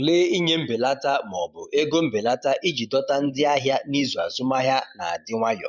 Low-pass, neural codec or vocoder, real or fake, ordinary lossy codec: 7.2 kHz; vocoder, 44.1 kHz, 128 mel bands every 512 samples, BigVGAN v2; fake; none